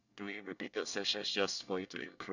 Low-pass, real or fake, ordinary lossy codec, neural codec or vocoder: 7.2 kHz; fake; none; codec, 24 kHz, 1 kbps, SNAC